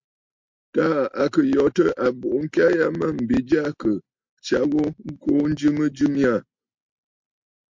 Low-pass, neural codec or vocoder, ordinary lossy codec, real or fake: 7.2 kHz; none; MP3, 48 kbps; real